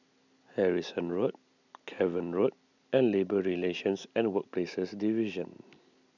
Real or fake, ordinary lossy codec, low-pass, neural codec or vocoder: real; none; 7.2 kHz; none